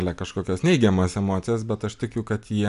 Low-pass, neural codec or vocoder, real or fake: 10.8 kHz; none; real